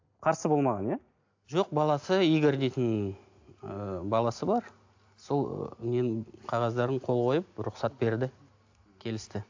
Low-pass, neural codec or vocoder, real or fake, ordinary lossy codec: 7.2 kHz; none; real; AAC, 48 kbps